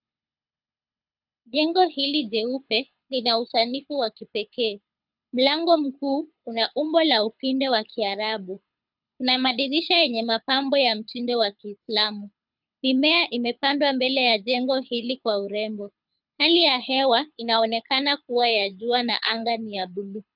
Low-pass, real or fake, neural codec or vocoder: 5.4 kHz; fake; codec, 24 kHz, 6 kbps, HILCodec